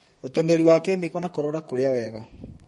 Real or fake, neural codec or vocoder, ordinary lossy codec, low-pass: fake; codec, 32 kHz, 1.9 kbps, SNAC; MP3, 48 kbps; 14.4 kHz